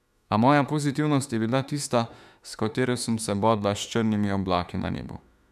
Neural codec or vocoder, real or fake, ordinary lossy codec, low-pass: autoencoder, 48 kHz, 32 numbers a frame, DAC-VAE, trained on Japanese speech; fake; none; 14.4 kHz